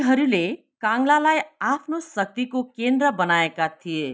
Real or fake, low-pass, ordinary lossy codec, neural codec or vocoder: real; none; none; none